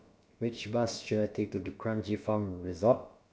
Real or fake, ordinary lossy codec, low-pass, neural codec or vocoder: fake; none; none; codec, 16 kHz, about 1 kbps, DyCAST, with the encoder's durations